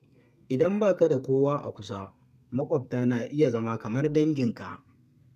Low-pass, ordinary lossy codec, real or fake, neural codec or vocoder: 14.4 kHz; none; fake; codec, 32 kHz, 1.9 kbps, SNAC